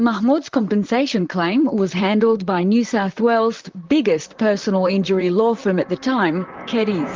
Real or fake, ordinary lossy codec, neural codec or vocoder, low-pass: fake; Opus, 24 kbps; vocoder, 44.1 kHz, 128 mel bands, Pupu-Vocoder; 7.2 kHz